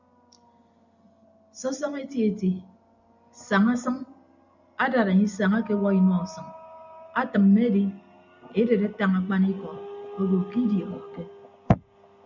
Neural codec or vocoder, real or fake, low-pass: none; real; 7.2 kHz